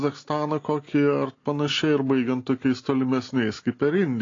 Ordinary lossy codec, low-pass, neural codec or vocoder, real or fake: AAC, 32 kbps; 7.2 kHz; none; real